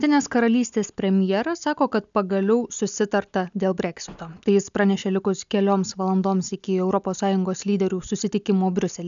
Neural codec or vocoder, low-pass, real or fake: none; 7.2 kHz; real